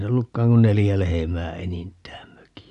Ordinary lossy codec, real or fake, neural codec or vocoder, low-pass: none; real; none; 10.8 kHz